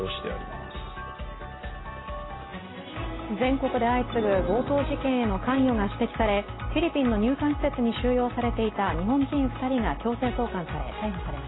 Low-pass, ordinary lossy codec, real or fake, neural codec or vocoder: 7.2 kHz; AAC, 16 kbps; real; none